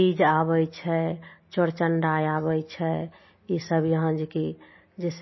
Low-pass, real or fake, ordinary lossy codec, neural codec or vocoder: 7.2 kHz; real; MP3, 24 kbps; none